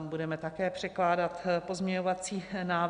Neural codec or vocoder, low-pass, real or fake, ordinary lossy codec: none; 9.9 kHz; real; Opus, 64 kbps